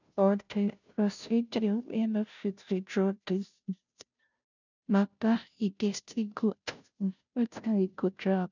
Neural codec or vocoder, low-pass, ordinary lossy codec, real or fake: codec, 16 kHz, 0.5 kbps, FunCodec, trained on Chinese and English, 25 frames a second; 7.2 kHz; none; fake